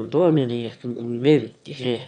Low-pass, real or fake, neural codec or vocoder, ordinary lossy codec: 9.9 kHz; fake; autoencoder, 22.05 kHz, a latent of 192 numbers a frame, VITS, trained on one speaker; none